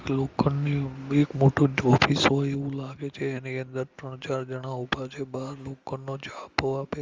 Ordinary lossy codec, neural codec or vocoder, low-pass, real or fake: Opus, 32 kbps; none; 7.2 kHz; real